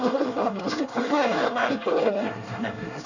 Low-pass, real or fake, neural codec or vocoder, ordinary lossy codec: 7.2 kHz; fake; codec, 24 kHz, 1 kbps, SNAC; none